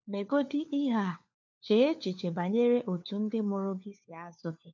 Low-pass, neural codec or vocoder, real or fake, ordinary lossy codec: 7.2 kHz; codec, 16 kHz, 4 kbps, FunCodec, trained on LibriTTS, 50 frames a second; fake; MP3, 64 kbps